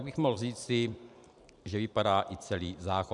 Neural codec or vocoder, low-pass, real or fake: vocoder, 44.1 kHz, 128 mel bands every 512 samples, BigVGAN v2; 10.8 kHz; fake